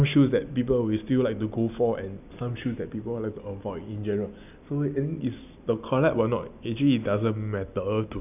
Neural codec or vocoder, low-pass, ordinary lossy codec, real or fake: none; 3.6 kHz; none; real